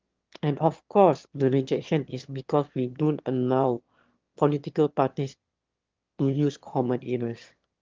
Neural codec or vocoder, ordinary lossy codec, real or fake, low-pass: autoencoder, 22.05 kHz, a latent of 192 numbers a frame, VITS, trained on one speaker; Opus, 32 kbps; fake; 7.2 kHz